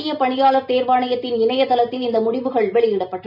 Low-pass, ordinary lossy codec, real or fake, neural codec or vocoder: 5.4 kHz; none; real; none